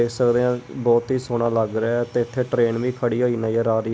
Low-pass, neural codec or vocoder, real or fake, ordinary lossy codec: none; none; real; none